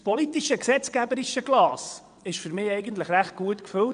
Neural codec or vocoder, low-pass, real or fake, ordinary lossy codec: vocoder, 22.05 kHz, 80 mel bands, WaveNeXt; 9.9 kHz; fake; none